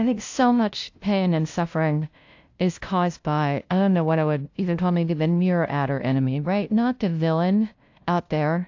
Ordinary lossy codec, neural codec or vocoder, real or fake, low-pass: AAC, 48 kbps; codec, 16 kHz, 0.5 kbps, FunCodec, trained on LibriTTS, 25 frames a second; fake; 7.2 kHz